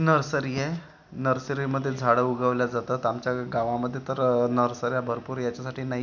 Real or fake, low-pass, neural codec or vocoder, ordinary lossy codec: real; 7.2 kHz; none; none